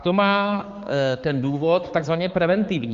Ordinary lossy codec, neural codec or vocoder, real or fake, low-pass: Opus, 32 kbps; codec, 16 kHz, 4 kbps, X-Codec, HuBERT features, trained on balanced general audio; fake; 7.2 kHz